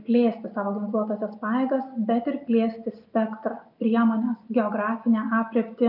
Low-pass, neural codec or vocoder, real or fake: 5.4 kHz; none; real